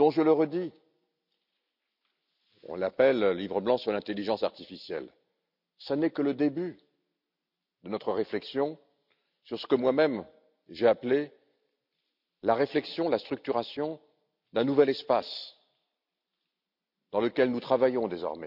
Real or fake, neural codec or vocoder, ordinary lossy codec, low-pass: real; none; none; 5.4 kHz